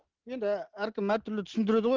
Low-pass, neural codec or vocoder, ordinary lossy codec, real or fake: 7.2 kHz; none; Opus, 16 kbps; real